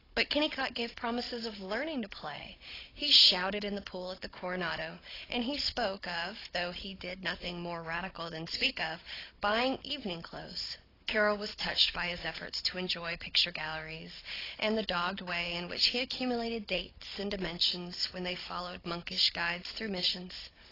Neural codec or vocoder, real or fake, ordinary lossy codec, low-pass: codec, 16 kHz, 16 kbps, FunCodec, trained on Chinese and English, 50 frames a second; fake; AAC, 24 kbps; 5.4 kHz